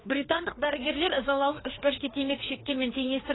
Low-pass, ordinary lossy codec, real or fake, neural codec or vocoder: 7.2 kHz; AAC, 16 kbps; fake; codec, 16 kHz, 2 kbps, FreqCodec, larger model